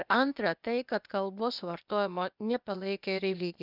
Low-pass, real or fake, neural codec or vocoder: 5.4 kHz; fake; codec, 16 kHz, 0.8 kbps, ZipCodec